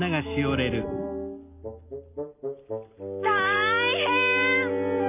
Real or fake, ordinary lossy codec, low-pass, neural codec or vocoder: real; none; 3.6 kHz; none